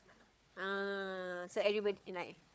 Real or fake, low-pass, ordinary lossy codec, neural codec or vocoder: fake; none; none; codec, 16 kHz, 4 kbps, FunCodec, trained on Chinese and English, 50 frames a second